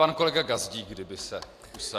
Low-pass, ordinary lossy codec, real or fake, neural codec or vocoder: 14.4 kHz; AAC, 64 kbps; real; none